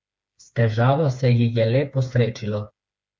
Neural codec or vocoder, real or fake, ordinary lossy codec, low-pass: codec, 16 kHz, 4 kbps, FreqCodec, smaller model; fake; none; none